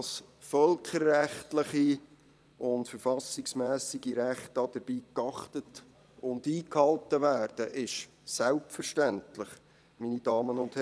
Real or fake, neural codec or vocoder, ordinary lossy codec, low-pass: fake; vocoder, 22.05 kHz, 80 mel bands, Vocos; none; none